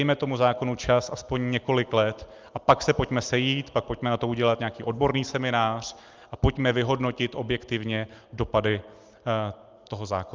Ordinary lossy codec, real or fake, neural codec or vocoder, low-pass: Opus, 32 kbps; real; none; 7.2 kHz